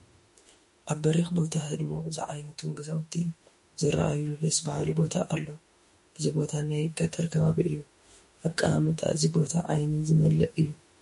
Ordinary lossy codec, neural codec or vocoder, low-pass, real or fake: MP3, 48 kbps; autoencoder, 48 kHz, 32 numbers a frame, DAC-VAE, trained on Japanese speech; 14.4 kHz; fake